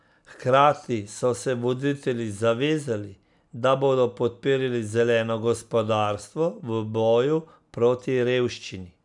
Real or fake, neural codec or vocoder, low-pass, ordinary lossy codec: real; none; 10.8 kHz; none